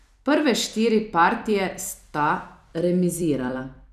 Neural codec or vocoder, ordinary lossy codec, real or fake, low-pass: vocoder, 44.1 kHz, 128 mel bands every 256 samples, BigVGAN v2; none; fake; 14.4 kHz